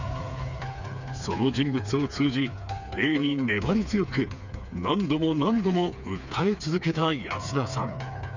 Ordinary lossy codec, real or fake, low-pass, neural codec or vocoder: none; fake; 7.2 kHz; codec, 16 kHz, 4 kbps, FreqCodec, smaller model